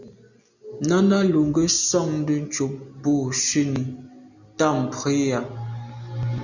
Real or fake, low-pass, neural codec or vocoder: real; 7.2 kHz; none